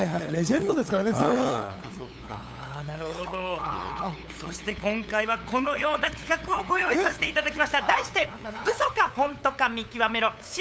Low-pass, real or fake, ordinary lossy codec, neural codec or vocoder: none; fake; none; codec, 16 kHz, 8 kbps, FunCodec, trained on LibriTTS, 25 frames a second